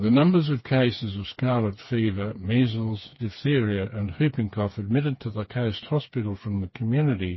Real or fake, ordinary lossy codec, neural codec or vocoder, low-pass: fake; MP3, 24 kbps; codec, 16 kHz, 4 kbps, FreqCodec, smaller model; 7.2 kHz